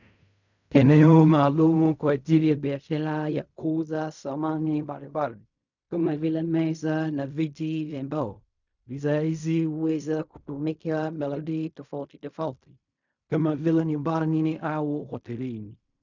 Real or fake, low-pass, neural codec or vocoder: fake; 7.2 kHz; codec, 16 kHz in and 24 kHz out, 0.4 kbps, LongCat-Audio-Codec, fine tuned four codebook decoder